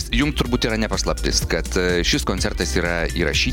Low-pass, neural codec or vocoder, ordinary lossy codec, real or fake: 19.8 kHz; none; MP3, 96 kbps; real